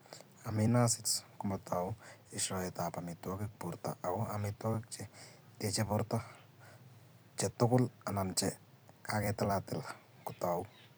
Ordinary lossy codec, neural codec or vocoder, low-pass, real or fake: none; vocoder, 44.1 kHz, 128 mel bands every 256 samples, BigVGAN v2; none; fake